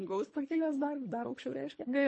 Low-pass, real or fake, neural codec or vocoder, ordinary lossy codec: 10.8 kHz; fake; codec, 24 kHz, 3 kbps, HILCodec; MP3, 32 kbps